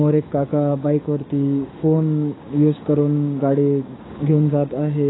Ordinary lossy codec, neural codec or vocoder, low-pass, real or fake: AAC, 16 kbps; codec, 16 kHz, 6 kbps, DAC; 7.2 kHz; fake